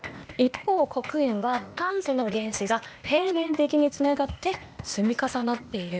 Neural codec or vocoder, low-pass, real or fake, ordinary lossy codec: codec, 16 kHz, 0.8 kbps, ZipCodec; none; fake; none